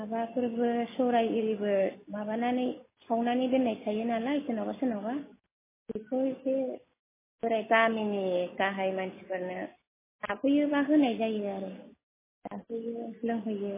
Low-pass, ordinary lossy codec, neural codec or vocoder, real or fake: 3.6 kHz; MP3, 16 kbps; none; real